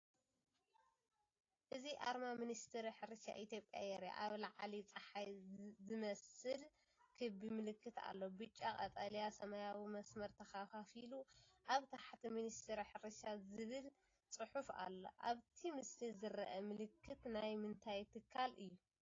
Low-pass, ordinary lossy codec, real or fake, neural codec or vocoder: 7.2 kHz; AAC, 32 kbps; real; none